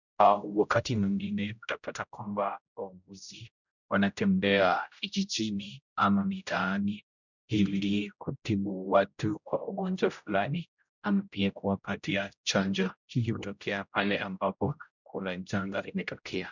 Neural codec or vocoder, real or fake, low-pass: codec, 16 kHz, 0.5 kbps, X-Codec, HuBERT features, trained on general audio; fake; 7.2 kHz